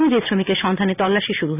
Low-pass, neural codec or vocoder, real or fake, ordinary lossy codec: 3.6 kHz; none; real; none